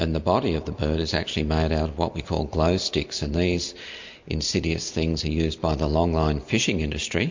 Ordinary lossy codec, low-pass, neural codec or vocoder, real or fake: MP3, 48 kbps; 7.2 kHz; none; real